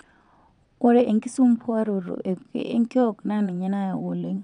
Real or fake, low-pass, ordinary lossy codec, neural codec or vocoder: fake; 9.9 kHz; none; vocoder, 22.05 kHz, 80 mel bands, Vocos